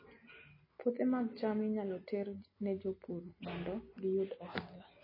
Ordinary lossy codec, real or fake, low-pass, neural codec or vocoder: MP3, 24 kbps; real; 5.4 kHz; none